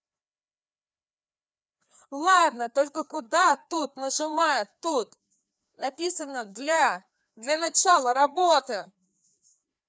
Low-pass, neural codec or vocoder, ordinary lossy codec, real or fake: none; codec, 16 kHz, 2 kbps, FreqCodec, larger model; none; fake